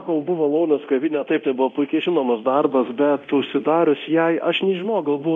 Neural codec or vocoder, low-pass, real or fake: codec, 24 kHz, 0.9 kbps, DualCodec; 10.8 kHz; fake